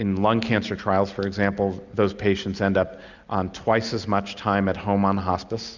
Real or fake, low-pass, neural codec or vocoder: real; 7.2 kHz; none